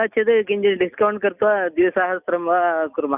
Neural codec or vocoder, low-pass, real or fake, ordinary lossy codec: none; 3.6 kHz; real; none